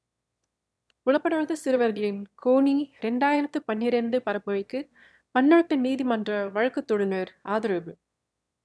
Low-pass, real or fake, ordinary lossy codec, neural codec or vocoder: none; fake; none; autoencoder, 22.05 kHz, a latent of 192 numbers a frame, VITS, trained on one speaker